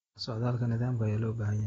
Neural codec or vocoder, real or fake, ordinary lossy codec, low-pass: none; real; AAC, 24 kbps; 19.8 kHz